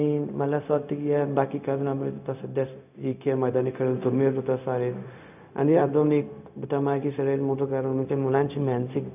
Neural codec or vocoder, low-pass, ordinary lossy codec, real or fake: codec, 16 kHz, 0.4 kbps, LongCat-Audio-Codec; 3.6 kHz; none; fake